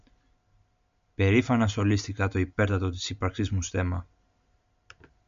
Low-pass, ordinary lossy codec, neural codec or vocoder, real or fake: 7.2 kHz; MP3, 64 kbps; none; real